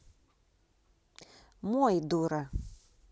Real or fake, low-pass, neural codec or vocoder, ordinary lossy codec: real; none; none; none